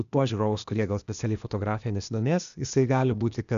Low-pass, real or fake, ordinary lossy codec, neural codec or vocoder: 7.2 kHz; fake; MP3, 96 kbps; codec, 16 kHz, 0.8 kbps, ZipCodec